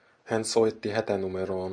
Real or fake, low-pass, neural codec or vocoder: real; 9.9 kHz; none